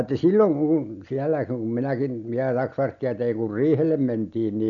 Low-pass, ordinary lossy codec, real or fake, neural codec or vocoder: 7.2 kHz; none; real; none